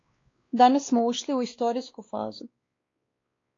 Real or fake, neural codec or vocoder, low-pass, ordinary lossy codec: fake; codec, 16 kHz, 2 kbps, X-Codec, WavLM features, trained on Multilingual LibriSpeech; 7.2 kHz; AAC, 32 kbps